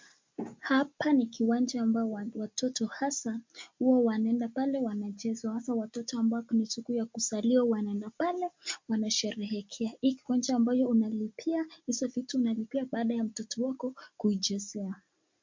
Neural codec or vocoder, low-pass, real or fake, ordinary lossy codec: none; 7.2 kHz; real; MP3, 48 kbps